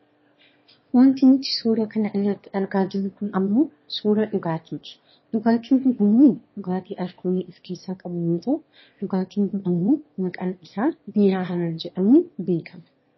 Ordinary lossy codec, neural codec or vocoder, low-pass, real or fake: MP3, 24 kbps; autoencoder, 22.05 kHz, a latent of 192 numbers a frame, VITS, trained on one speaker; 7.2 kHz; fake